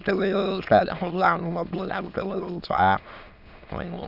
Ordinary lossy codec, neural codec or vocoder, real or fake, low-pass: none; autoencoder, 22.05 kHz, a latent of 192 numbers a frame, VITS, trained on many speakers; fake; 5.4 kHz